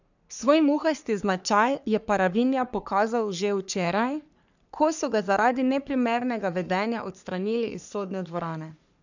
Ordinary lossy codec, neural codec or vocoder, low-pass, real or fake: none; codec, 44.1 kHz, 3.4 kbps, Pupu-Codec; 7.2 kHz; fake